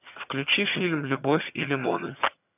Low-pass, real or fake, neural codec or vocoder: 3.6 kHz; fake; vocoder, 22.05 kHz, 80 mel bands, HiFi-GAN